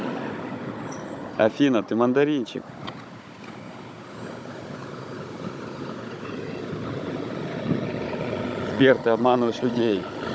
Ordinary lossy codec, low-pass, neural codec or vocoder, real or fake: none; none; codec, 16 kHz, 16 kbps, FunCodec, trained on Chinese and English, 50 frames a second; fake